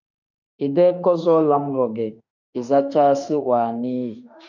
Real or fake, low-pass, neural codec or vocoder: fake; 7.2 kHz; autoencoder, 48 kHz, 32 numbers a frame, DAC-VAE, trained on Japanese speech